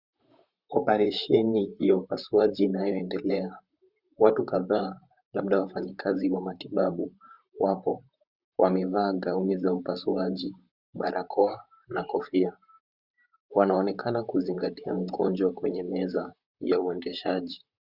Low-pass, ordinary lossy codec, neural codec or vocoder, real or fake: 5.4 kHz; Opus, 64 kbps; vocoder, 44.1 kHz, 128 mel bands, Pupu-Vocoder; fake